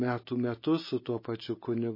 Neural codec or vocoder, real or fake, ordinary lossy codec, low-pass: none; real; MP3, 24 kbps; 5.4 kHz